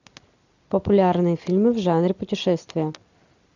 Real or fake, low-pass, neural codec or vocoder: real; 7.2 kHz; none